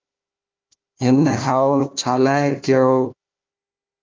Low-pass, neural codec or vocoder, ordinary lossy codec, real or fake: 7.2 kHz; codec, 16 kHz, 1 kbps, FunCodec, trained on Chinese and English, 50 frames a second; Opus, 24 kbps; fake